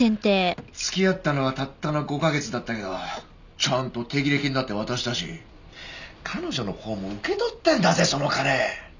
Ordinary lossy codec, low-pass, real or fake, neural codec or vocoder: none; 7.2 kHz; real; none